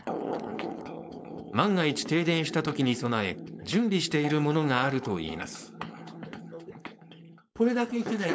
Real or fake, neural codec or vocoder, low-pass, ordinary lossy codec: fake; codec, 16 kHz, 4.8 kbps, FACodec; none; none